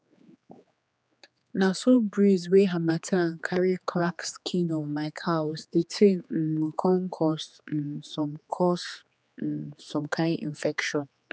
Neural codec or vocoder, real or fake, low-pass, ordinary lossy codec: codec, 16 kHz, 4 kbps, X-Codec, HuBERT features, trained on general audio; fake; none; none